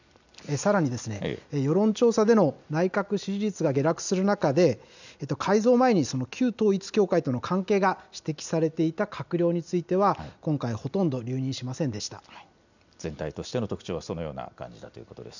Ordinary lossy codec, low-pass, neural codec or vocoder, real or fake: none; 7.2 kHz; none; real